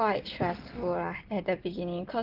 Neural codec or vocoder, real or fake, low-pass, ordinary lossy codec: none; real; 5.4 kHz; Opus, 16 kbps